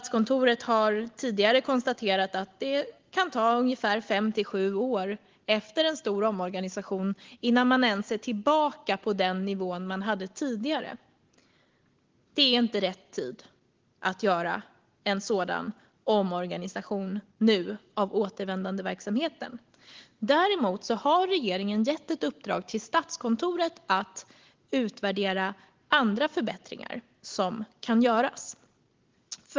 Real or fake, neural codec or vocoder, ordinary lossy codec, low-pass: real; none; Opus, 16 kbps; 7.2 kHz